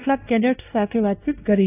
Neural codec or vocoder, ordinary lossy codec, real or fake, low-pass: codec, 16 kHz, 1 kbps, X-Codec, WavLM features, trained on Multilingual LibriSpeech; AAC, 16 kbps; fake; 3.6 kHz